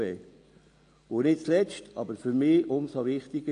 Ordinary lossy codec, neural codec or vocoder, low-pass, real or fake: AAC, 48 kbps; none; 9.9 kHz; real